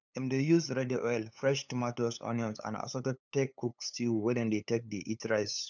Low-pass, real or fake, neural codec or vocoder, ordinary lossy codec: 7.2 kHz; fake; codec, 16 kHz, 8 kbps, FunCodec, trained on LibriTTS, 25 frames a second; none